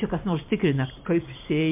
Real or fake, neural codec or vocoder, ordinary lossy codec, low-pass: fake; vocoder, 44.1 kHz, 128 mel bands every 512 samples, BigVGAN v2; MP3, 32 kbps; 3.6 kHz